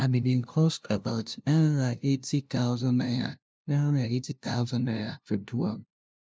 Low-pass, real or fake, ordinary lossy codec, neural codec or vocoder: none; fake; none; codec, 16 kHz, 0.5 kbps, FunCodec, trained on LibriTTS, 25 frames a second